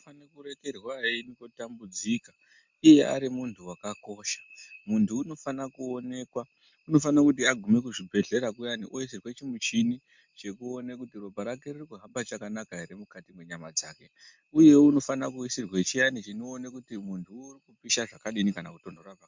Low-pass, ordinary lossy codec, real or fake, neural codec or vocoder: 7.2 kHz; MP3, 64 kbps; real; none